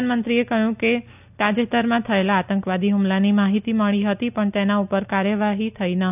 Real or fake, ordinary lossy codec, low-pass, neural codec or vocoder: real; none; 3.6 kHz; none